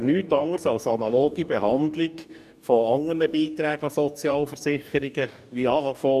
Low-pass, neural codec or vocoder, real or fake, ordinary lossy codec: 14.4 kHz; codec, 44.1 kHz, 2.6 kbps, DAC; fake; none